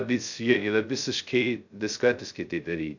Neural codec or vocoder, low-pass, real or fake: codec, 16 kHz, 0.2 kbps, FocalCodec; 7.2 kHz; fake